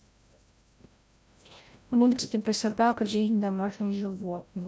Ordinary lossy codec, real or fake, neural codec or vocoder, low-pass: none; fake; codec, 16 kHz, 0.5 kbps, FreqCodec, larger model; none